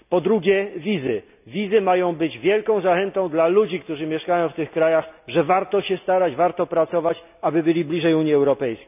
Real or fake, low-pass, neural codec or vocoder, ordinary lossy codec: real; 3.6 kHz; none; none